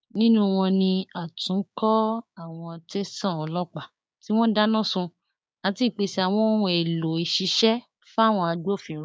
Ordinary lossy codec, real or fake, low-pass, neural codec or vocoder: none; fake; none; codec, 16 kHz, 6 kbps, DAC